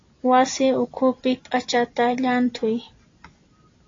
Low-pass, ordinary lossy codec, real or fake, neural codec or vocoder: 7.2 kHz; AAC, 32 kbps; real; none